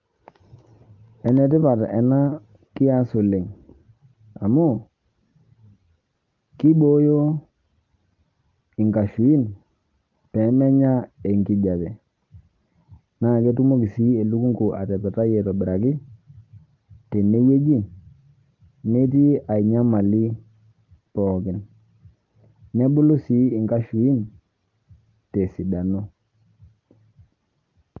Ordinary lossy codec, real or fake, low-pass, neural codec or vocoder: Opus, 32 kbps; real; 7.2 kHz; none